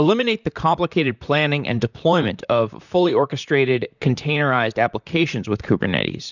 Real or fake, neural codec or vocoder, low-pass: fake; vocoder, 44.1 kHz, 128 mel bands, Pupu-Vocoder; 7.2 kHz